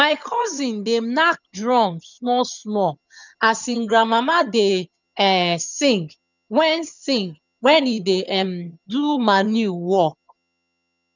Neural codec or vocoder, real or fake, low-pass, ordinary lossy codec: vocoder, 22.05 kHz, 80 mel bands, HiFi-GAN; fake; 7.2 kHz; none